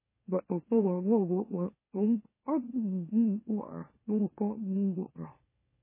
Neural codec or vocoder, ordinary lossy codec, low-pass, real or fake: autoencoder, 44.1 kHz, a latent of 192 numbers a frame, MeloTTS; MP3, 16 kbps; 3.6 kHz; fake